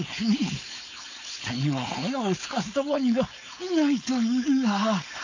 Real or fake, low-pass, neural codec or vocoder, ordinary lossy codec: fake; 7.2 kHz; codec, 16 kHz, 4.8 kbps, FACodec; AAC, 48 kbps